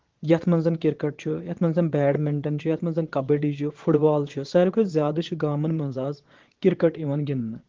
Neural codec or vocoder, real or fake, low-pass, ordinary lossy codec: vocoder, 22.05 kHz, 80 mel bands, Vocos; fake; 7.2 kHz; Opus, 16 kbps